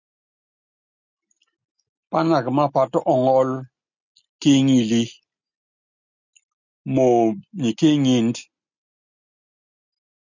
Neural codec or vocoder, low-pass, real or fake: none; 7.2 kHz; real